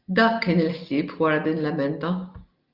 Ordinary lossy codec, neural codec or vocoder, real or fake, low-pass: Opus, 24 kbps; none; real; 5.4 kHz